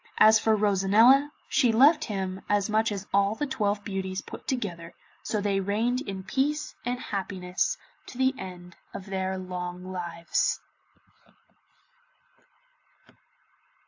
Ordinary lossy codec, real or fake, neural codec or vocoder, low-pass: AAC, 48 kbps; real; none; 7.2 kHz